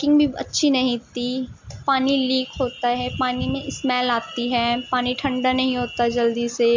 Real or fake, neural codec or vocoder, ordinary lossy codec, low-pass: real; none; MP3, 64 kbps; 7.2 kHz